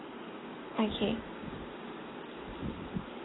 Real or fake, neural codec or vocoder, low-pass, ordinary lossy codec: real; none; 7.2 kHz; AAC, 16 kbps